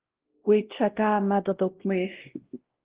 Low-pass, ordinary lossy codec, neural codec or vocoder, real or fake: 3.6 kHz; Opus, 16 kbps; codec, 16 kHz, 1 kbps, X-Codec, WavLM features, trained on Multilingual LibriSpeech; fake